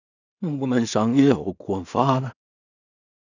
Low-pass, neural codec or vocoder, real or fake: 7.2 kHz; codec, 16 kHz in and 24 kHz out, 0.4 kbps, LongCat-Audio-Codec, two codebook decoder; fake